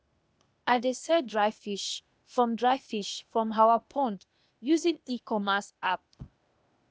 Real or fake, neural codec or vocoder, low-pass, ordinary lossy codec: fake; codec, 16 kHz, 0.8 kbps, ZipCodec; none; none